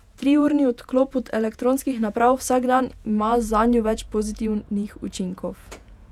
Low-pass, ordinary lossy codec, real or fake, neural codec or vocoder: 19.8 kHz; none; fake; vocoder, 48 kHz, 128 mel bands, Vocos